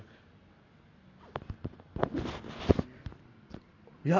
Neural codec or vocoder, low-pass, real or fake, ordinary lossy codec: none; 7.2 kHz; real; none